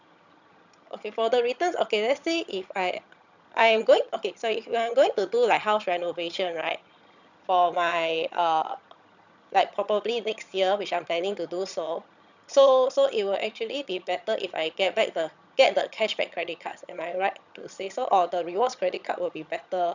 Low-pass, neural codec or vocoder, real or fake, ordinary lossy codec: 7.2 kHz; vocoder, 22.05 kHz, 80 mel bands, HiFi-GAN; fake; none